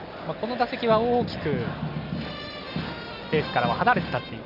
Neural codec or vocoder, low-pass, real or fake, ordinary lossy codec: none; 5.4 kHz; real; none